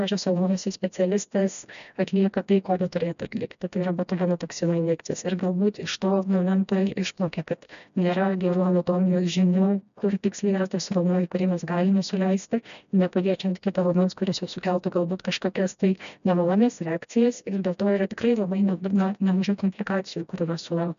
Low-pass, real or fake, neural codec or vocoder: 7.2 kHz; fake; codec, 16 kHz, 1 kbps, FreqCodec, smaller model